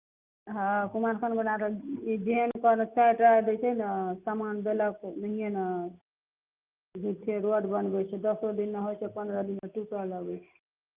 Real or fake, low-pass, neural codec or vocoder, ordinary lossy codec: real; 3.6 kHz; none; Opus, 24 kbps